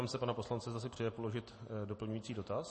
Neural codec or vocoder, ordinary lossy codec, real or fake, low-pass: none; MP3, 32 kbps; real; 10.8 kHz